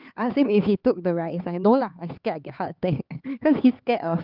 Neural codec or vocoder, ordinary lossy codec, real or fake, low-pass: codec, 16 kHz, 4 kbps, X-Codec, HuBERT features, trained on LibriSpeech; Opus, 24 kbps; fake; 5.4 kHz